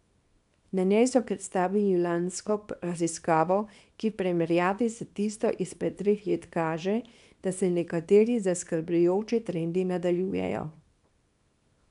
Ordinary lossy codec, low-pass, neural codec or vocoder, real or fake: none; 10.8 kHz; codec, 24 kHz, 0.9 kbps, WavTokenizer, small release; fake